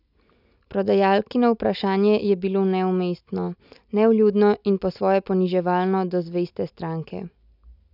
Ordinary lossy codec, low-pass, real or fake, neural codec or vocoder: none; 5.4 kHz; real; none